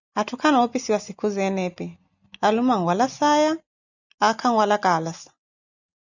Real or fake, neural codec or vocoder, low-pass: real; none; 7.2 kHz